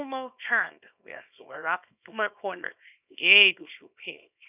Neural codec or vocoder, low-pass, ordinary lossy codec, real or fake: codec, 24 kHz, 0.9 kbps, WavTokenizer, small release; 3.6 kHz; none; fake